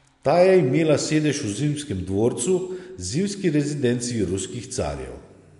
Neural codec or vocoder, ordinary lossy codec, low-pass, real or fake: none; MP3, 64 kbps; 10.8 kHz; real